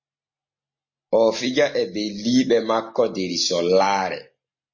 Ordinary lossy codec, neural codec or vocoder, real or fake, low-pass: MP3, 32 kbps; none; real; 7.2 kHz